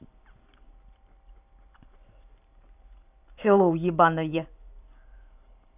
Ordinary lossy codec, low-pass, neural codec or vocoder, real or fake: none; 3.6 kHz; vocoder, 44.1 kHz, 128 mel bands every 512 samples, BigVGAN v2; fake